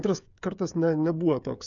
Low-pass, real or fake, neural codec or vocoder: 7.2 kHz; fake; codec, 16 kHz, 8 kbps, FreqCodec, smaller model